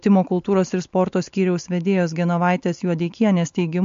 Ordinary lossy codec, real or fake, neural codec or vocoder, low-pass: MP3, 48 kbps; real; none; 7.2 kHz